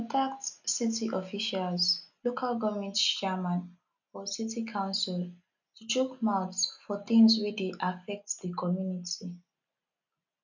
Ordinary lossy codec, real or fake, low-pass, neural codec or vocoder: none; real; 7.2 kHz; none